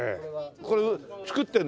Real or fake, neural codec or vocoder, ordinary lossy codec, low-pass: real; none; none; none